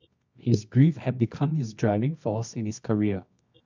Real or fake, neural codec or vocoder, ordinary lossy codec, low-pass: fake; codec, 24 kHz, 0.9 kbps, WavTokenizer, medium music audio release; MP3, 64 kbps; 7.2 kHz